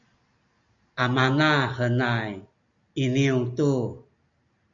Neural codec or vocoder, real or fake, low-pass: none; real; 7.2 kHz